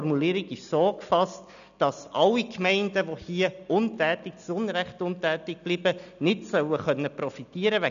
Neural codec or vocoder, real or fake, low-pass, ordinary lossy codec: none; real; 7.2 kHz; none